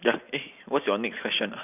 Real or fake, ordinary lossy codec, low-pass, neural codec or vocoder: real; none; 3.6 kHz; none